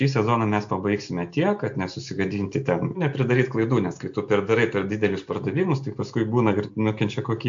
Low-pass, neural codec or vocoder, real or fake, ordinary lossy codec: 7.2 kHz; none; real; AAC, 64 kbps